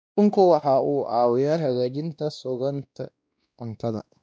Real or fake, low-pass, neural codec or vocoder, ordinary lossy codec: fake; none; codec, 16 kHz, 1 kbps, X-Codec, WavLM features, trained on Multilingual LibriSpeech; none